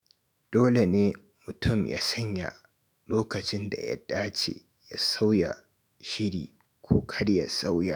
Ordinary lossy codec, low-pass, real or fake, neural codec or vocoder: none; none; fake; autoencoder, 48 kHz, 128 numbers a frame, DAC-VAE, trained on Japanese speech